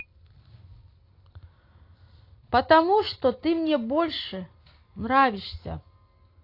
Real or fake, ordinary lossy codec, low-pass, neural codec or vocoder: real; AAC, 32 kbps; 5.4 kHz; none